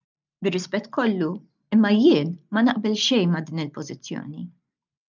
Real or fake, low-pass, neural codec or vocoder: real; 7.2 kHz; none